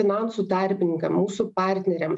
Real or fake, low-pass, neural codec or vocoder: real; 10.8 kHz; none